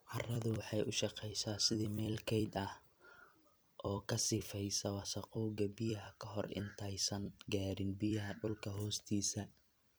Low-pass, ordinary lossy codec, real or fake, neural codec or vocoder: none; none; fake; vocoder, 44.1 kHz, 128 mel bands every 256 samples, BigVGAN v2